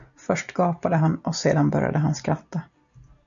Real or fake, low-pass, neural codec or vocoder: real; 7.2 kHz; none